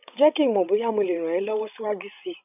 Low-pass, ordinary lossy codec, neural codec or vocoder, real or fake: 3.6 kHz; none; codec, 16 kHz, 16 kbps, FreqCodec, larger model; fake